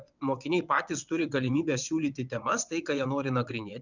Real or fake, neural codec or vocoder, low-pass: fake; vocoder, 22.05 kHz, 80 mel bands, WaveNeXt; 7.2 kHz